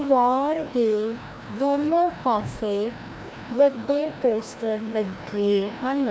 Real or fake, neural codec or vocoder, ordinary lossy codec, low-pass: fake; codec, 16 kHz, 1 kbps, FreqCodec, larger model; none; none